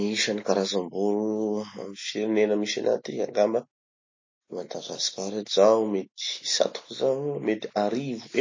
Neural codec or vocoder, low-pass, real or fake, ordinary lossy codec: none; 7.2 kHz; real; MP3, 32 kbps